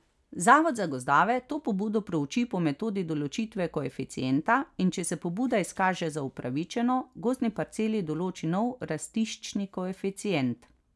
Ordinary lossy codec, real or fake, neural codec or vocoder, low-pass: none; real; none; none